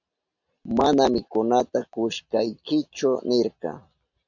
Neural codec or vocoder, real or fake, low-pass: none; real; 7.2 kHz